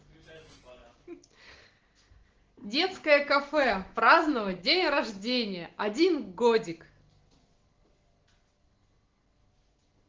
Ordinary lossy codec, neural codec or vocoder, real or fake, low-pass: Opus, 16 kbps; none; real; 7.2 kHz